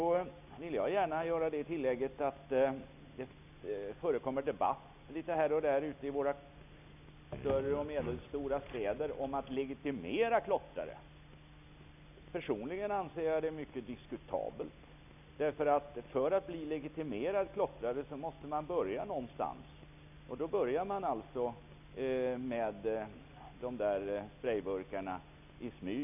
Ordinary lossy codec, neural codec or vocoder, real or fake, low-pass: none; none; real; 3.6 kHz